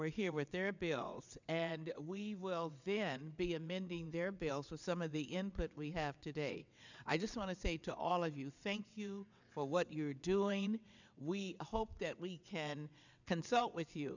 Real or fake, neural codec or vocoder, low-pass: fake; vocoder, 22.05 kHz, 80 mel bands, WaveNeXt; 7.2 kHz